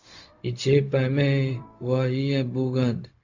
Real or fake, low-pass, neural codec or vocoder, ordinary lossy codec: fake; 7.2 kHz; codec, 16 kHz, 0.4 kbps, LongCat-Audio-Codec; AAC, 48 kbps